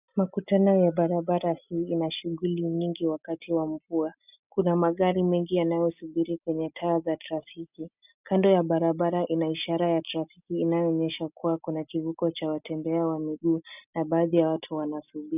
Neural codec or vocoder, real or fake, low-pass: none; real; 3.6 kHz